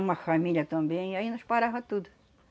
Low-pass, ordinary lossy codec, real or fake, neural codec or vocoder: none; none; real; none